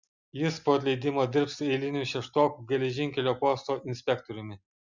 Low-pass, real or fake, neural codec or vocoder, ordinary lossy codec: 7.2 kHz; real; none; Opus, 64 kbps